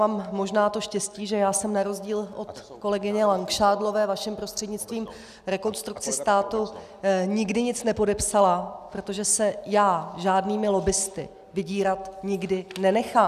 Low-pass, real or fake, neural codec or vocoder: 14.4 kHz; real; none